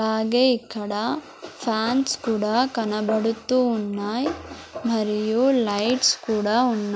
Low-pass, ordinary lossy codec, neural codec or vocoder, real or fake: none; none; none; real